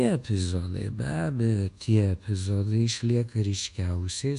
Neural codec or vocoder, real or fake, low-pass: codec, 24 kHz, 1.2 kbps, DualCodec; fake; 10.8 kHz